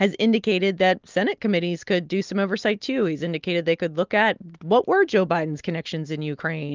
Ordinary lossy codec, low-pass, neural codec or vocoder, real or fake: Opus, 32 kbps; 7.2 kHz; none; real